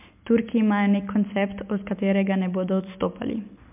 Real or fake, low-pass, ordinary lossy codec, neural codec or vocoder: real; 3.6 kHz; MP3, 32 kbps; none